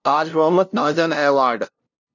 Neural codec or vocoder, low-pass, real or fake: codec, 16 kHz, 0.5 kbps, FunCodec, trained on LibriTTS, 25 frames a second; 7.2 kHz; fake